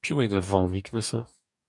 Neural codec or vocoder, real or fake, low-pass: codec, 44.1 kHz, 2.6 kbps, DAC; fake; 10.8 kHz